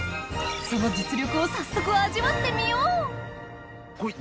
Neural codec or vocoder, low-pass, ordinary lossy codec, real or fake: none; none; none; real